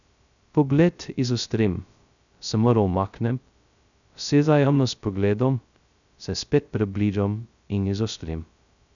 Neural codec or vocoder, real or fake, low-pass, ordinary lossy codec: codec, 16 kHz, 0.2 kbps, FocalCodec; fake; 7.2 kHz; none